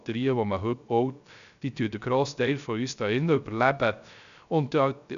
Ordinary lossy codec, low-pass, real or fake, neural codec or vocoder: none; 7.2 kHz; fake; codec, 16 kHz, 0.3 kbps, FocalCodec